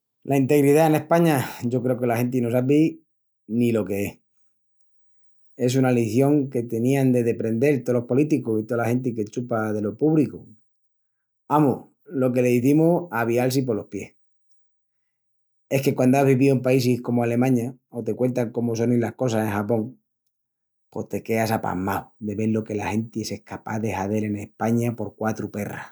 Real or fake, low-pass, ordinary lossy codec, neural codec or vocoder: real; none; none; none